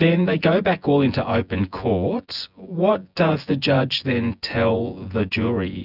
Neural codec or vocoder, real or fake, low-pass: vocoder, 24 kHz, 100 mel bands, Vocos; fake; 5.4 kHz